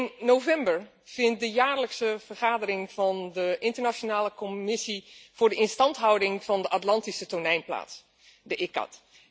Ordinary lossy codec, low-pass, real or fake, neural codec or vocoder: none; none; real; none